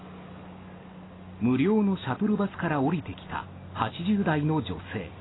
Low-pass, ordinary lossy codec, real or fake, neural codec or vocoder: 7.2 kHz; AAC, 16 kbps; real; none